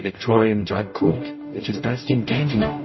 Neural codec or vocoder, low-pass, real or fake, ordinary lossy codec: codec, 44.1 kHz, 0.9 kbps, DAC; 7.2 kHz; fake; MP3, 24 kbps